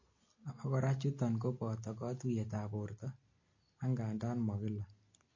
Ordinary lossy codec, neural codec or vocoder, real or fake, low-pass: MP3, 32 kbps; none; real; 7.2 kHz